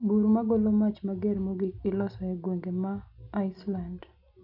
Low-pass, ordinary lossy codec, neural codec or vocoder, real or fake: 5.4 kHz; none; none; real